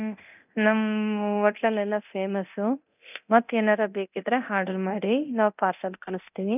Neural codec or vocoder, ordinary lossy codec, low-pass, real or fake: codec, 24 kHz, 0.9 kbps, DualCodec; none; 3.6 kHz; fake